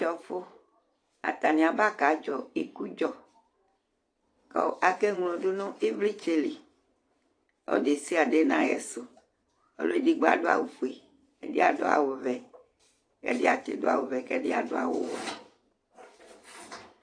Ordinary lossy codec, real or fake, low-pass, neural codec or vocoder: AAC, 48 kbps; fake; 9.9 kHz; vocoder, 22.05 kHz, 80 mel bands, Vocos